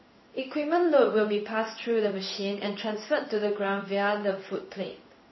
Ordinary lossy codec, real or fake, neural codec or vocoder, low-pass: MP3, 24 kbps; fake; codec, 16 kHz in and 24 kHz out, 1 kbps, XY-Tokenizer; 7.2 kHz